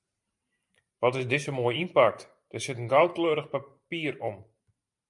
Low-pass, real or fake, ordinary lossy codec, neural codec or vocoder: 10.8 kHz; fake; MP3, 96 kbps; vocoder, 44.1 kHz, 128 mel bands every 512 samples, BigVGAN v2